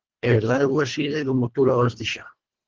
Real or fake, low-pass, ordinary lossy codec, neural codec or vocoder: fake; 7.2 kHz; Opus, 16 kbps; codec, 24 kHz, 1.5 kbps, HILCodec